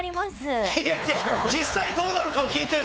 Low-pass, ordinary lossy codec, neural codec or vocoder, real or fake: none; none; codec, 16 kHz, 4 kbps, X-Codec, WavLM features, trained on Multilingual LibriSpeech; fake